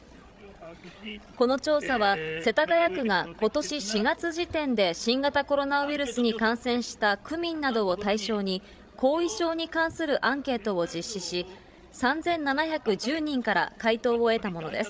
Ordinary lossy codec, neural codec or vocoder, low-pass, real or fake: none; codec, 16 kHz, 16 kbps, FreqCodec, larger model; none; fake